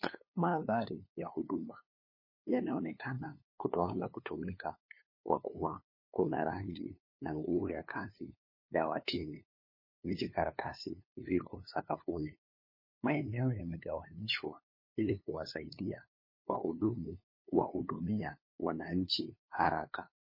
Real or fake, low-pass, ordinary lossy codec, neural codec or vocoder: fake; 5.4 kHz; MP3, 24 kbps; codec, 16 kHz, 2 kbps, FunCodec, trained on LibriTTS, 25 frames a second